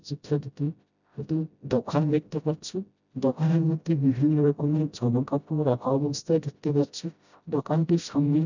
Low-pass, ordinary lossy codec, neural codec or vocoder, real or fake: 7.2 kHz; none; codec, 16 kHz, 0.5 kbps, FreqCodec, smaller model; fake